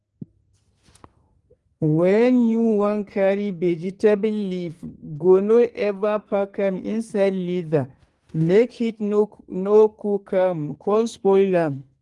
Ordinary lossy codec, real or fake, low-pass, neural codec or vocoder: Opus, 24 kbps; fake; 10.8 kHz; codec, 44.1 kHz, 2.6 kbps, SNAC